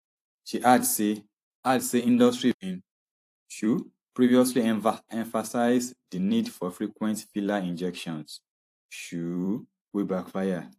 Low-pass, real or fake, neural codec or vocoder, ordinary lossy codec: 14.4 kHz; fake; vocoder, 44.1 kHz, 128 mel bands every 256 samples, BigVGAN v2; AAC, 64 kbps